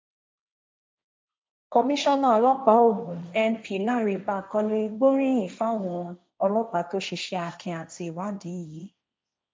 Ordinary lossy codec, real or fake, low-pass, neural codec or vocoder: none; fake; none; codec, 16 kHz, 1.1 kbps, Voila-Tokenizer